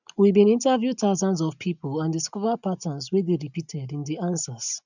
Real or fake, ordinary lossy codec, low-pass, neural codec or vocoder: real; none; 7.2 kHz; none